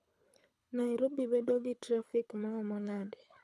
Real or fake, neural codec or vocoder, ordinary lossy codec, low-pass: fake; codec, 24 kHz, 6 kbps, HILCodec; none; none